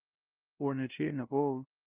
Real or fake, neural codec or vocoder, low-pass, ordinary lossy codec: fake; codec, 16 kHz, 0.5 kbps, FunCodec, trained on LibriTTS, 25 frames a second; 3.6 kHz; Opus, 32 kbps